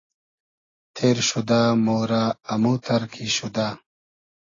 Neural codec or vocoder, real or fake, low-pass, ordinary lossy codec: none; real; 7.2 kHz; AAC, 32 kbps